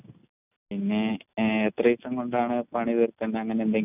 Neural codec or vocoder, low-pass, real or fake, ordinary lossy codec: none; 3.6 kHz; real; none